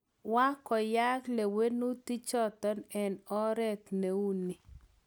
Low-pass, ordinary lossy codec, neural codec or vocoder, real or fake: none; none; none; real